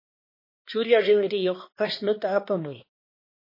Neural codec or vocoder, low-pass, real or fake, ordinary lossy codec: codec, 16 kHz, 2 kbps, X-Codec, HuBERT features, trained on balanced general audio; 5.4 kHz; fake; MP3, 24 kbps